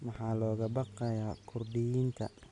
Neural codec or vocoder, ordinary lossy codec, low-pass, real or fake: none; none; 10.8 kHz; real